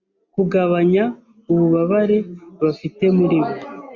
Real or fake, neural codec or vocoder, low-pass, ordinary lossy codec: real; none; 7.2 kHz; Opus, 64 kbps